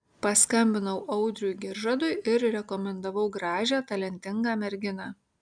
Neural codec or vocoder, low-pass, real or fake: none; 9.9 kHz; real